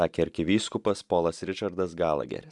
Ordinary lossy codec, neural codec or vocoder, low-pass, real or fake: MP3, 96 kbps; none; 10.8 kHz; real